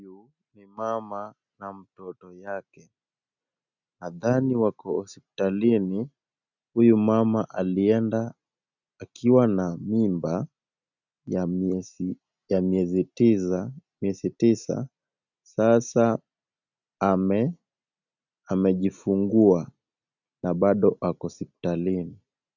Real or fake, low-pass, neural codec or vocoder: real; 7.2 kHz; none